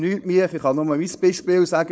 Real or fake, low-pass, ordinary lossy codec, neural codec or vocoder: fake; none; none; codec, 16 kHz, 4.8 kbps, FACodec